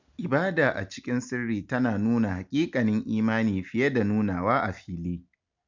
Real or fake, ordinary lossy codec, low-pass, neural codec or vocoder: real; MP3, 64 kbps; 7.2 kHz; none